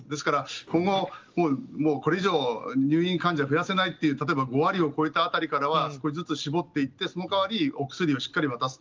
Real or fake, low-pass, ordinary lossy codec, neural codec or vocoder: real; 7.2 kHz; Opus, 32 kbps; none